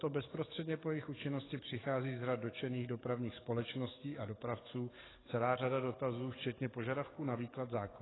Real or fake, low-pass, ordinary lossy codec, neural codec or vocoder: fake; 7.2 kHz; AAC, 16 kbps; codec, 44.1 kHz, 7.8 kbps, DAC